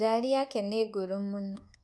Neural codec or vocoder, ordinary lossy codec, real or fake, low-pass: codec, 24 kHz, 1.2 kbps, DualCodec; none; fake; none